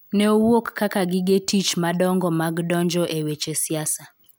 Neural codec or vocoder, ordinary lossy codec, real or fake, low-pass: none; none; real; none